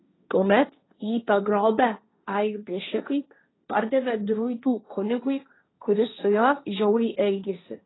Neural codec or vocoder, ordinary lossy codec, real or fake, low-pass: codec, 16 kHz, 1.1 kbps, Voila-Tokenizer; AAC, 16 kbps; fake; 7.2 kHz